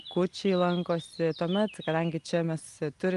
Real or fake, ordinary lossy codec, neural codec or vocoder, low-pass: real; Opus, 32 kbps; none; 10.8 kHz